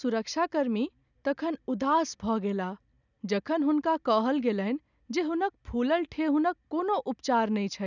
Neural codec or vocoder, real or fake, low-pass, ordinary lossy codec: none; real; 7.2 kHz; none